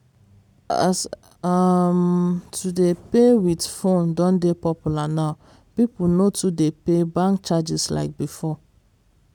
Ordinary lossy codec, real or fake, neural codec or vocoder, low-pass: none; fake; vocoder, 44.1 kHz, 128 mel bands every 256 samples, BigVGAN v2; 19.8 kHz